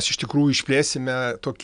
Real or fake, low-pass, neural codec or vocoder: real; 9.9 kHz; none